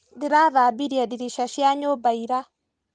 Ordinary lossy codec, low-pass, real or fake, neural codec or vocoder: Opus, 32 kbps; 9.9 kHz; real; none